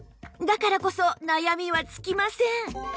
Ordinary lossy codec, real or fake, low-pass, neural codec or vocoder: none; real; none; none